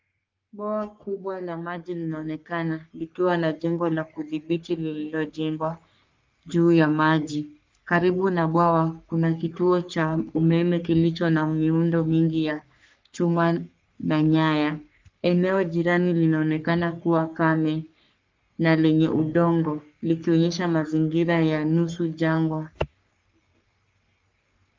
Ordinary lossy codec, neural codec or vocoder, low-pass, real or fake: Opus, 24 kbps; codec, 44.1 kHz, 3.4 kbps, Pupu-Codec; 7.2 kHz; fake